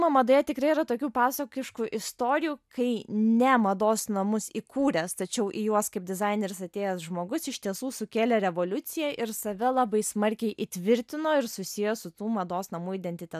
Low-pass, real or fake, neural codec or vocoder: 14.4 kHz; real; none